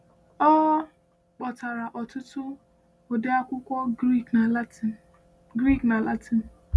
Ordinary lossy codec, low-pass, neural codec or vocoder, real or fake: none; none; none; real